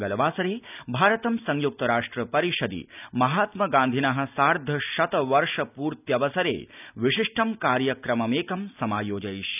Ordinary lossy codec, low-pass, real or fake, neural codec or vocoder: none; 3.6 kHz; real; none